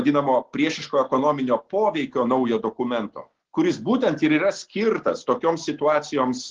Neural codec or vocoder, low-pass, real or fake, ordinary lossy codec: none; 7.2 kHz; real; Opus, 16 kbps